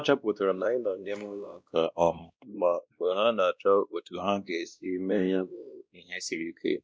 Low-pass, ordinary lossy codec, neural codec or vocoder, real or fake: none; none; codec, 16 kHz, 1 kbps, X-Codec, WavLM features, trained on Multilingual LibriSpeech; fake